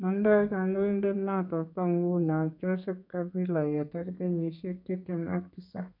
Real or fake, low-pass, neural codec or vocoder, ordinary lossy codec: fake; 5.4 kHz; codec, 32 kHz, 1.9 kbps, SNAC; none